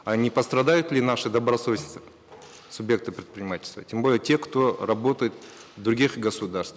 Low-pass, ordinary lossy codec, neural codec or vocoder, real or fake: none; none; none; real